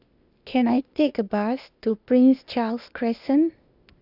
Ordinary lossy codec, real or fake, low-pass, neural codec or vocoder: none; fake; 5.4 kHz; codec, 16 kHz, 0.8 kbps, ZipCodec